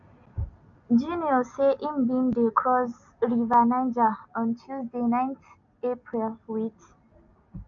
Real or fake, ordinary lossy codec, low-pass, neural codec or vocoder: real; AAC, 64 kbps; 7.2 kHz; none